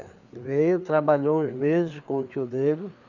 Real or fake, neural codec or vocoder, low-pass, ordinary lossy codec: fake; codec, 16 kHz, 4 kbps, FreqCodec, larger model; 7.2 kHz; none